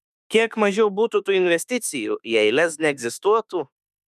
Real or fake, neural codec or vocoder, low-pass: fake; autoencoder, 48 kHz, 32 numbers a frame, DAC-VAE, trained on Japanese speech; 14.4 kHz